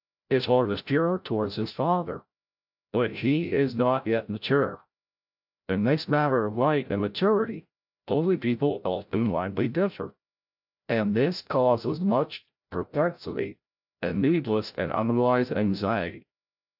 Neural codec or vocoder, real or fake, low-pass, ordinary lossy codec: codec, 16 kHz, 0.5 kbps, FreqCodec, larger model; fake; 5.4 kHz; AAC, 48 kbps